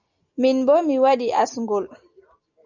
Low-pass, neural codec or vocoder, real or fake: 7.2 kHz; none; real